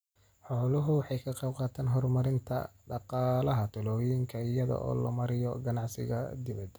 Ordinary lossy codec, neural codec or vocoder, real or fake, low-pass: none; none; real; none